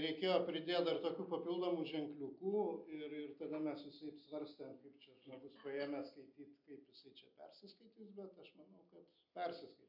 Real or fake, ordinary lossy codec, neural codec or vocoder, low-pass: real; MP3, 48 kbps; none; 5.4 kHz